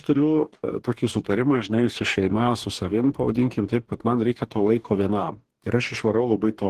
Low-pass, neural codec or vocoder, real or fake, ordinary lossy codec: 14.4 kHz; codec, 44.1 kHz, 2.6 kbps, DAC; fake; Opus, 16 kbps